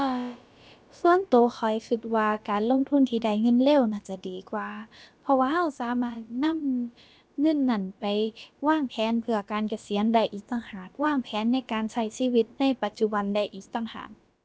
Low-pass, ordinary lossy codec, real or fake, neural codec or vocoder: none; none; fake; codec, 16 kHz, about 1 kbps, DyCAST, with the encoder's durations